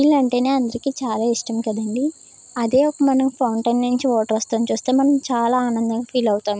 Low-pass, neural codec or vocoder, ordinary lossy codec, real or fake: none; none; none; real